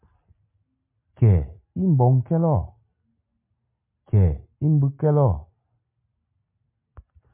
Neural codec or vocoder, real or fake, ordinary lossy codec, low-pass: none; real; MP3, 32 kbps; 3.6 kHz